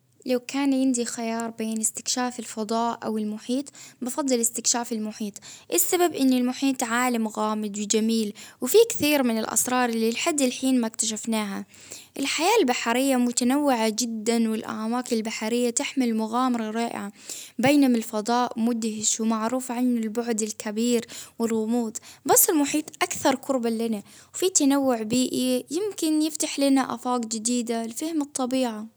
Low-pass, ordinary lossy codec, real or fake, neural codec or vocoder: none; none; real; none